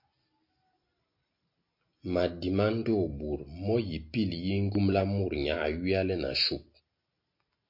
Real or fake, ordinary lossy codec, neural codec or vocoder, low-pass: real; MP3, 32 kbps; none; 5.4 kHz